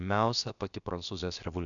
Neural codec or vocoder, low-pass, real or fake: codec, 16 kHz, about 1 kbps, DyCAST, with the encoder's durations; 7.2 kHz; fake